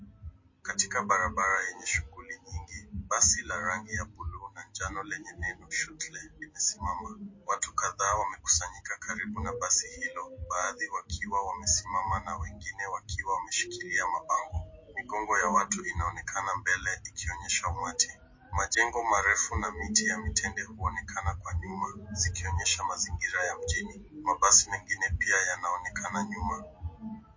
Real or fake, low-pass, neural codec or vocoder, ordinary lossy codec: real; 7.2 kHz; none; MP3, 32 kbps